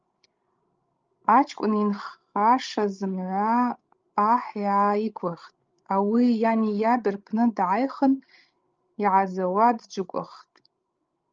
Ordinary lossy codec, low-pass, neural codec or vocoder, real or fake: Opus, 24 kbps; 7.2 kHz; codec, 16 kHz, 16 kbps, FreqCodec, larger model; fake